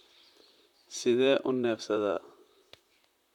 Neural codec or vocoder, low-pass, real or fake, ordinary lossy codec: vocoder, 44.1 kHz, 128 mel bands, Pupu-Vocoder; 19.8 kHz; fake; none